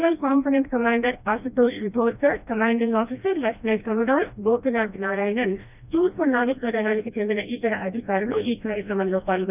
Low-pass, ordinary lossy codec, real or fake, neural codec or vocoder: 3.6 kHz; none; fake; codec, 16 kHz, 1 kbps, FreqCodec, smaller model